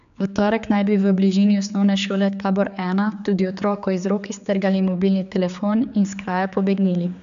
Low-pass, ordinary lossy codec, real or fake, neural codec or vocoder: 7.2 kHz; none; fake; codec, 16 kHz, 4 kbps, X-Codec, HuBERT features, trained on general audio